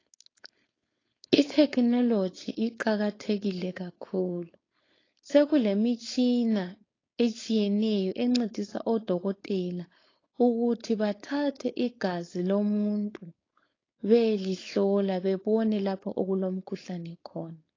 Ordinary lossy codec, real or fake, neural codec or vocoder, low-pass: AAC, 32 kbps; fake; codec, 16 kHz, 4.8 kbps, FACodec; 7.2 kHz